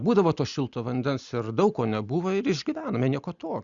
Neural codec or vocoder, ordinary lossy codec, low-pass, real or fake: none; Opus, 64 kbps; 7.2 kHz; real